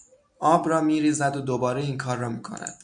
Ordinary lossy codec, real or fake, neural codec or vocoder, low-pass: MP3, 64 kbps; real; none; 9.9 kHz